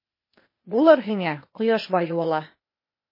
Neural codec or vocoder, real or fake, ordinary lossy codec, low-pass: codec, 16 kHz, 0.8 kbps, ZipCodec; fake; MP3, 24 kbps; 5.4 kHz